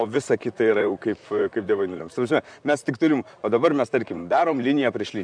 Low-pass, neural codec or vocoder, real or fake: 9.9 kHz; vocoder, 44.1 kHz, 128 mel bands, Pupu-Vocoder; fake